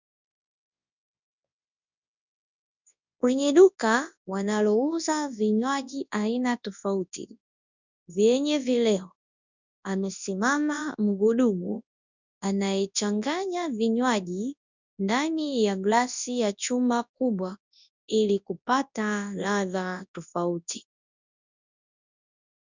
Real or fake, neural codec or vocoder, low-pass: fake; codec, 24 kHz, 0.9 kbps, WavTokenizer, large speech release; 7.2 kHz